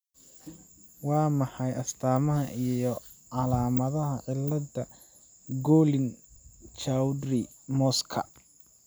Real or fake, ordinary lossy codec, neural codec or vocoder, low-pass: real; none; none; none